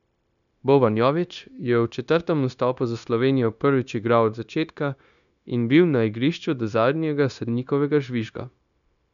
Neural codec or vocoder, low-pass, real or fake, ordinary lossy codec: codec, 16 kHz, 0.9 kbps, LongCat-Audio-Codec; 7.2 kHz; fake; none